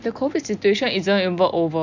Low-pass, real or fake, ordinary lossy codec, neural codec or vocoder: 7.2 kHz; real; none; none